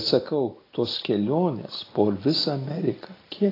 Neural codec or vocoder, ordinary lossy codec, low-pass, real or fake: none; AAC, 24 kbps; 5.4 kHz; real